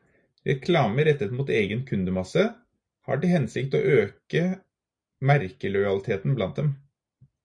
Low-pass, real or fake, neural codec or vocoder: 9.9 kHz; real; none